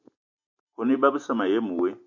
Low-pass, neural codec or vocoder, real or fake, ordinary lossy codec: 7.2 kHz; none; real; MP3, 48 kbps